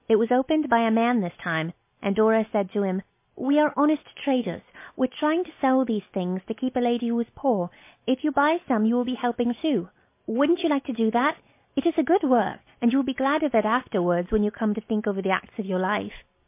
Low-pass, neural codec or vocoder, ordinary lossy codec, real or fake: 3.6 kHz; none; MP3, 24 kbps; real